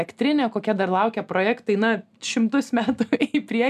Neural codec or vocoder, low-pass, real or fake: none; 14.4 kHz; real